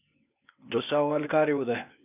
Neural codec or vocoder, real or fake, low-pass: codec, 16 kHz, 0.8 kbps, ZipCodec; fake; 3.6 kHz